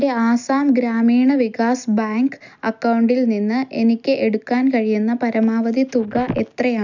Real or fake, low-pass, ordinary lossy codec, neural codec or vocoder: real; 7.2 kHz; none; none